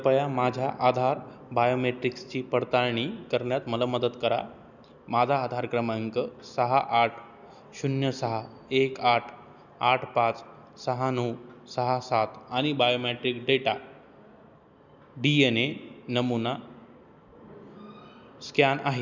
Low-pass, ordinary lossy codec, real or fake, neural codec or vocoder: 7.2 kHz; none; real; none